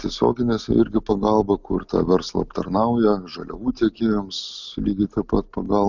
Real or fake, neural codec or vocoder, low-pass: real; none; 7.2 kHz